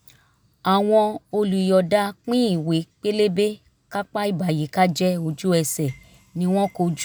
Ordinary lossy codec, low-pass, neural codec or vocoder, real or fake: none; none; none; real